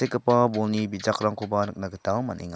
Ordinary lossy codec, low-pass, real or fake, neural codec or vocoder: none; none; real; none